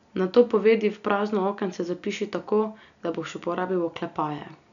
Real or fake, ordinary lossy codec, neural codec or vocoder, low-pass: real; none; none; 7.2 kHz